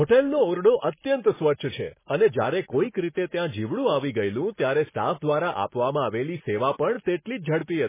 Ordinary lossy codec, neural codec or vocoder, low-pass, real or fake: MP3, 16 kbps; none; 3.6 kHz; real